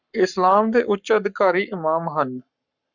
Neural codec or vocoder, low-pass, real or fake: codec, 44.1 kHz, 7.8 kbps, Pupu-Codec; 7.2 kHz; fake